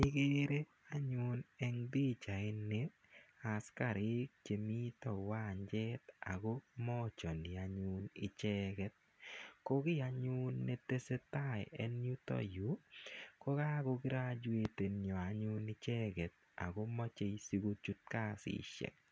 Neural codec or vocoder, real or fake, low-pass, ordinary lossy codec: none; real; none; none